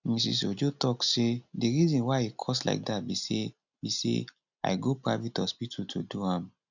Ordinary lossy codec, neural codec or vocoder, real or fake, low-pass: none; none; real; 7.2 kHz